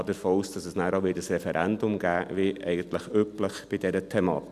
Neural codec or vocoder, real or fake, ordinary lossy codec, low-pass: none; real; none; 14.4 kHz